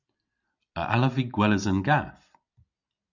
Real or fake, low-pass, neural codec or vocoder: real; 7.2 kHz; none